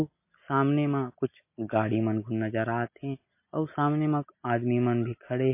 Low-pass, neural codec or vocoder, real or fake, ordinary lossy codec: 3.6 kHz; none; real; MP3, 24 kbps